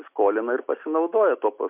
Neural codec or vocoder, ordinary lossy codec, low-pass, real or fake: none; MP3, 32 kbps; 3.6 kHz; real